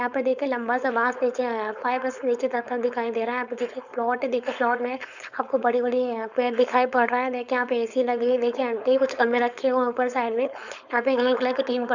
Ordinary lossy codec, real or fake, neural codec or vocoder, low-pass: none; fake; codec, 16 kHz, 4.8 kbps, FACodec; 7.2 kHz